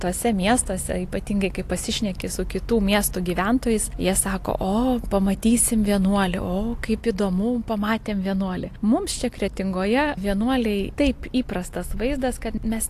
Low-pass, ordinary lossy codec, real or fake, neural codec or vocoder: 14.4 kHz; AAC, 64 kbps; real; none